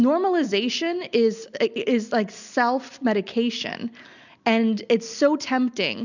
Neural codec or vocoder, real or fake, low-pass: none; real; 7.2 kHz